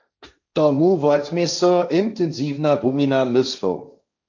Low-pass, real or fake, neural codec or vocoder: 7.2 kHz; fake; codec, 16 kHz, 1.1 kbps, Voila-Tokenizer